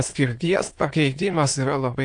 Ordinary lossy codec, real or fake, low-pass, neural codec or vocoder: AAC, 64 kbps; fake; 9.9 kHz; autoencoder, 22.05 kHz, a latent of 192 numbers a frame, VITS, trained on many speakers